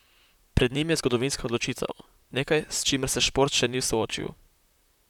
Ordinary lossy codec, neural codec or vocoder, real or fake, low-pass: none; vocoder, 44.1 kHz, 128 mel bands, Pupu-Vocoder; fake; 19.8 kHz